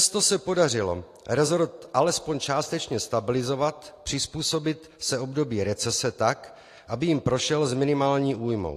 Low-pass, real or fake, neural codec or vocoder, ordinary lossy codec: 14.4 kHz; real; none; AAC, 48 kbps